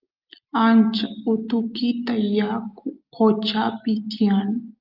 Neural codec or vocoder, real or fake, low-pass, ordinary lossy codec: none; real; 5.4 kHz; Opus, 24 kbps